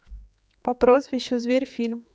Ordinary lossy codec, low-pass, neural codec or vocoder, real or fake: none; none; codec, 16 kHz, 4 kbps, X-Codec, HuBERT features, trained on general audio; fake